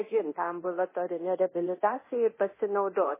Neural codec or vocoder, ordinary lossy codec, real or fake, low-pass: codec, 24 kHz, 0.9 kbps, DualCodec; MP3, 16 kbps; fake; 3.6 kHz